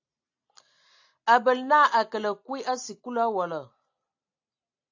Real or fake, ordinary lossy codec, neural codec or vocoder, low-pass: real; AAC, 48 kbps; none; 7.2 kHz